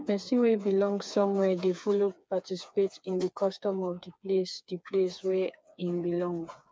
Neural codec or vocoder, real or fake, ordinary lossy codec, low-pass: codec, 16 kHz, 4 kbps, FreqCodec, smaller model; fake; none; none